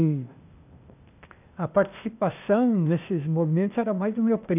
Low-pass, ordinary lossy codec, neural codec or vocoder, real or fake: 3.6 kHz; none; codec, 16 kHz, 0.8 kbps, ZipCodec; fake